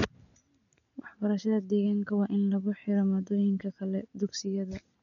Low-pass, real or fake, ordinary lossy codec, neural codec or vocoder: 7.2 kHz; real; none; none